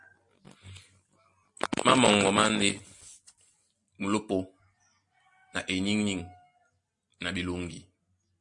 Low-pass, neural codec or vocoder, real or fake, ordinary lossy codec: 10.8 kHz; none; real; MP3, 48 kbps